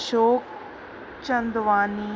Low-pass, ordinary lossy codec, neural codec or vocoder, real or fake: none; none; none; real